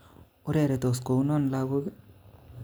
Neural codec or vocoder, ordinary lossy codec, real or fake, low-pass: none; none; real; none